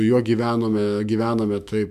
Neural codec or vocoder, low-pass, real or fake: autoencoder, 48 kHz, 128 numbers a frame, DAC-VAE, trained on Japanese speech; 14.4 kHz; fake